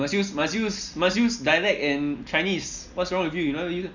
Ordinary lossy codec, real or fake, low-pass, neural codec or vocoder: none; real; 7.2 kHz; none